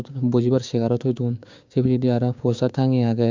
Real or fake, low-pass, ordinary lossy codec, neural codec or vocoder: fake; 7.2 kHz; MP3, 64 kbps; codec, 16 kHz, 6 kbps, DAC